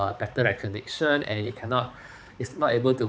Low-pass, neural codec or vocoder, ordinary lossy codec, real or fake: none; codec, 16 kHz, 4 kbps, X-Codec, HuBERT features, trained on balanced general audio; none; fake